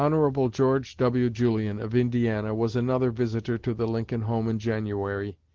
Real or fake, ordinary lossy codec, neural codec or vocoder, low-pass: real; Opus, 16 kbps; none; 7.2 kHz